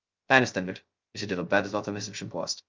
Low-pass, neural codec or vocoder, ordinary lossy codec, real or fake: 7.2 kHz; codec, 16 kHz, 0.2 kbps, FocalCodec; Opus, 24 kbps; fake